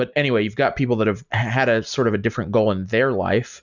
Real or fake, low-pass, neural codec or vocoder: real; 7.2 kHz; none